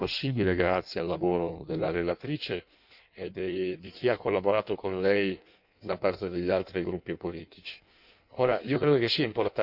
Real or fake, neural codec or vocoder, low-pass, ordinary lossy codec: fake; codec, 16 kHz in and 24 kHz out, 1.1 kbps, FireRedTTS-2 codec; 5.4 kHz; none